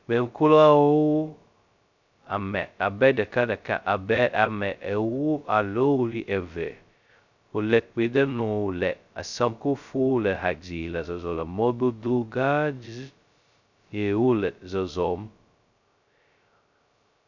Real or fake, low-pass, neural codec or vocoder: fake; 7.2 kHz; codec, 16 kHz, 0.2 kbps, FocalCodec